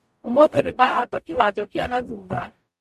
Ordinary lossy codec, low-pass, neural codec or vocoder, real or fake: MP3, 64 kbps; 14.4 kHz; codec, 44.1 kHz, 0.9 kbps, DAC; fake